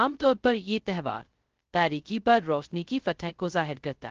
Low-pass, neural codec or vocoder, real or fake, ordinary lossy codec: 7.2 kHz; codec, 16 kHz, 0.2 kbps, FocalCodec; fake; Opus, 16 kbps